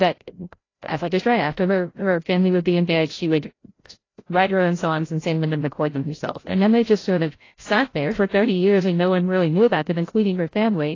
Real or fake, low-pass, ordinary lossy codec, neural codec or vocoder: fake; 7.2 kHz; AAC, 32 kbps; codec, 16 kHz, 0.5 kbps, FreqCodec, larger model